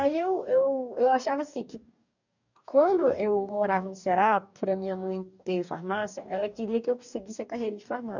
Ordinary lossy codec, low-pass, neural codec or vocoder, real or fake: none; 7.2 kHz; codec, 44.1 kHz, 2.6 kbps, DAC; fake